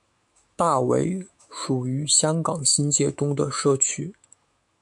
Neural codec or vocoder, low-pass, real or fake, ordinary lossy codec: autoencoder, 48 kHz, 128 numbers a frame, DAC-VAE, trained on Japanese speech; 10.8 kHz; fake; MP3, 64 kbps